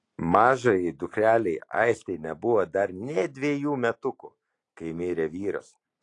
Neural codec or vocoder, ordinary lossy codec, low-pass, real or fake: none; AAC, 48 kbps; 10.8 kHz; real